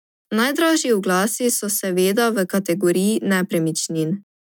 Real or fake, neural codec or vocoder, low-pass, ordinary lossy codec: real; none; 19.8 kHz; none